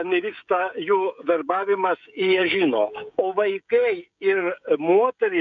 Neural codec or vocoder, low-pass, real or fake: codec, 16 kHz, 16 kbps, FreqCodec, smaller model; 7.2 kHz; fake